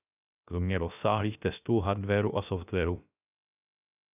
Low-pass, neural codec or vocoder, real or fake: 3.6 kHz; codec, 16 kHz, 0.3 kbps, FocalCodec; fake